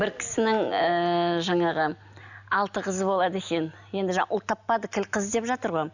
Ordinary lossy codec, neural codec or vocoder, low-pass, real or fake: none; none; 7.2 kHz; real